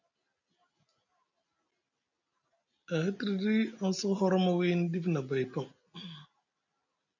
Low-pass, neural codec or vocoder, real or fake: 7.2 kHz; none; real